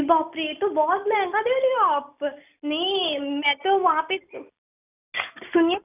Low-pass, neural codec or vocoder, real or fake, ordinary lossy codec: 3.6 kHz; none; real; none